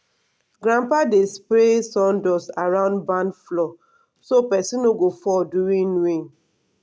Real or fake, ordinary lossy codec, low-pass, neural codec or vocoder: real; none; none; none